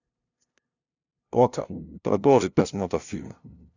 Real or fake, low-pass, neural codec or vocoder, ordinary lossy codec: fake; 7.2 kHz; codec, 16 kHz, 0.5 kbps, FunCodec, trained on LibriTTS, 25 frames a second; none